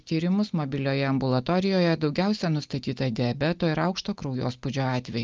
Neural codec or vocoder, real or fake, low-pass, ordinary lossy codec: none; real; 7.2 kHz; Opus, 24 kbps